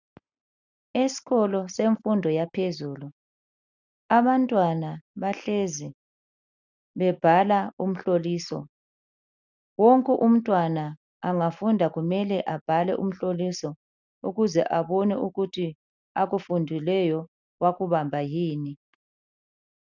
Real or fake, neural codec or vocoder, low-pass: real; none; 7.2 kHz